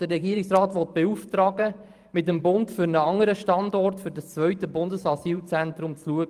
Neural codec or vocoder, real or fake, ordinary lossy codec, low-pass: vocoder, 44.1 kHz, 128 mel bands every 512 samples, BigVGAN v2; fake; Opus, 32 kbps; 14.4 kHz